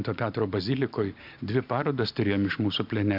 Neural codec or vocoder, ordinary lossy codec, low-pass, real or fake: none; MP3, 48 kbps; 5.4 kHz; real